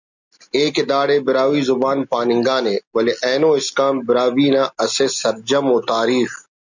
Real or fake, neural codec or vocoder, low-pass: real; none; 7.2 kHz